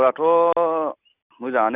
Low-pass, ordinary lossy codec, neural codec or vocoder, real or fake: 3.6 kHz; none; none; real